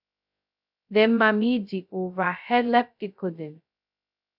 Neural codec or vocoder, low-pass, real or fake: codec, 16 kHz, 0.2 kbps, FocalCodec; 5.4 kHz; fake